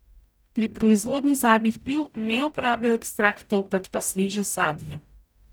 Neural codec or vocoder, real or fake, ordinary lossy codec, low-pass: codec, 44.1 kHz, 0.9 kbps, DAC; fake; none; none